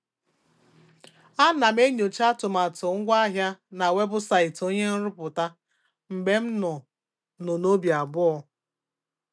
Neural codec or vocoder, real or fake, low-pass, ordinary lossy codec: none; real; none; none